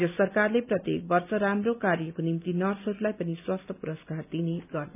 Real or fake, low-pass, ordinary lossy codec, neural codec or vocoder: real; 3.6 kHz; none; none